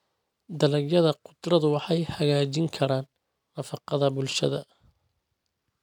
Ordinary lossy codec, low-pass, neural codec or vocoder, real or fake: none; 19.8 kHz; none; real